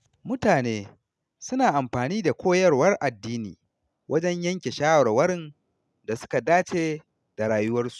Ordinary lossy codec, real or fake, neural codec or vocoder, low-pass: none; real; none; none